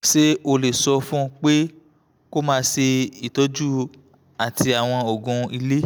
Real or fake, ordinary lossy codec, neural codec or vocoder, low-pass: real; none; none; none